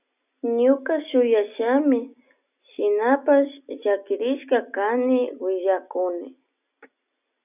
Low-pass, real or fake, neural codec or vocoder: 3.6 kHz; real; none